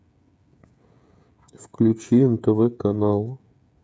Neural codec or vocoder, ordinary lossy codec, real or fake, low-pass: codec, 16 kHz, 16 kbps, FreqCodec, smaller model; none; fake; none